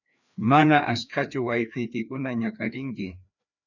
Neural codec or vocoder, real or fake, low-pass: codec, 16 kHz, 2 kbps, FreqCodec, larger model; fake; 7.2 kHz